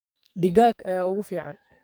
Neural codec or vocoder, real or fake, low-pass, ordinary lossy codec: codec, 44.1 kHz, 2.6 kbps, SNAC; fake; none; none